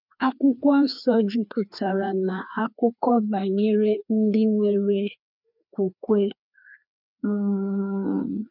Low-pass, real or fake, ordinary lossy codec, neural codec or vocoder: 5.4 kHz; fake; none; codec, 16 kHz, 2 kbps, FreqCodec, larger model